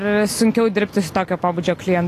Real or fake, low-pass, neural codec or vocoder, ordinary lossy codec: real; 14.4 kHz; none; AAC, 48 kbps